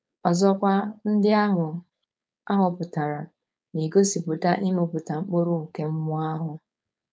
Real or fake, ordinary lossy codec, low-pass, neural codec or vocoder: fake; none; none; codec, 16 kHz, 4.8 kbps, FACodec